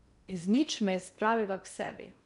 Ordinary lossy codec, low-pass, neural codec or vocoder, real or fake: none; 10.8 kHz; codec, 16 kHz in and 24 kHz out, 0.8 kbps, FocalCodec, streaming, 65536 codes; fake